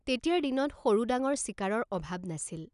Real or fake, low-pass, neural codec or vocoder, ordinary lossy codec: real; 10.8 kHz; none; none